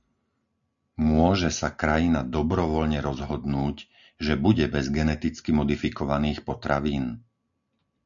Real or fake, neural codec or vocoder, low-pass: real; none; 7.2 kHz